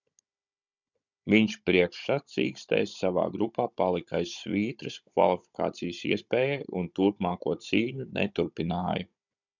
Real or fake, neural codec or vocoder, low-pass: fake; codec, 16 kHz, 16 kbps, FunCodec, trained on Chinese and English, 50 frames a second; 7.2 kHz